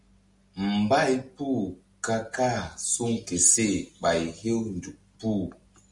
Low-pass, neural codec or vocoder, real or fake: 10.8 kHz; none; real